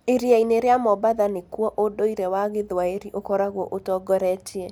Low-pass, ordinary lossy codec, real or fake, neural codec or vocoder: 19.8 kHz; none; real; none